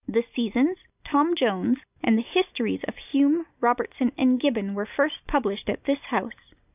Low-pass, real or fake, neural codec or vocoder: 3.6 kHz; real; none